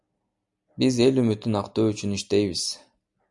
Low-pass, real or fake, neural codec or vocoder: 10.8 kHz; real; none